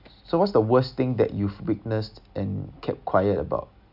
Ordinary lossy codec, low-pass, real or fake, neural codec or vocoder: none; 5.4 kHz; real; none